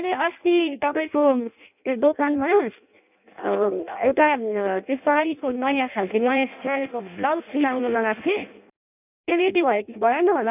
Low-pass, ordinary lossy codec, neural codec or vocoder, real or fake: 3.6 kHz; none; codec, 16 kHz in and 24 kHz out, 0.6 kbps, FireRedTTS-2 codec; fake